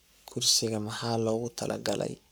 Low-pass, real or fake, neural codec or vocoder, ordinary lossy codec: none; fake; codec, 44.1 kHz, 7.8 kbps, Pupu-Codec; none